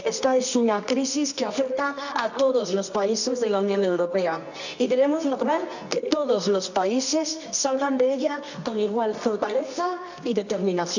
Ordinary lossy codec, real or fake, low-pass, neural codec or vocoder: none; fake; 7.2 kHz; codec, 24 kHz, 0.9 kbps, WavTokenizer, medium music audio release